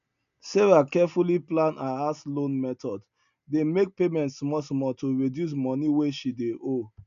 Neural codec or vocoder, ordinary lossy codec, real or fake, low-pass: none; none; real; 7.2 kHz